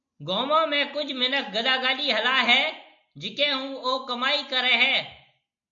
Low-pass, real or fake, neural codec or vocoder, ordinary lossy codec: 7.2 kHz; real; none; MP3, 64 kbps